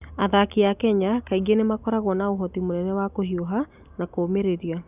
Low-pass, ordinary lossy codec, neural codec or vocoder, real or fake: 3.6 kHz; none; none; real